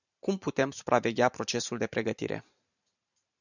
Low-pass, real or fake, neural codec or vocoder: 7.2 kHz; fake; vocoder, 44.1 kHz, 128 mel bands every 512 samples, BigVGAN v2